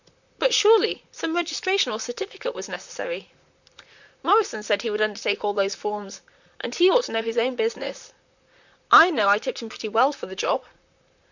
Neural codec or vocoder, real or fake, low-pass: vocoder, 44.1 kHz, 128 mel bands, Pupu-Vocoder; fake; 7.2 kHz